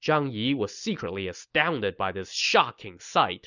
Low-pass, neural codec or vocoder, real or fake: 7.2 kHz; none; real